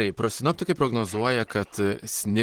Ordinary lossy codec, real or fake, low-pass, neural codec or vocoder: Opus, 16 kbps; fake; 19.8 kHz; vocoder, 44.1 kHz, 128 mel bands, Pupu-Vocoder